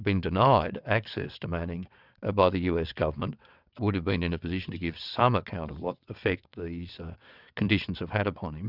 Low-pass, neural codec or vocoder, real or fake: 5.4 kHz; vocoder, 22.05 kHz, 80 mel bands, WaveNeXt; fake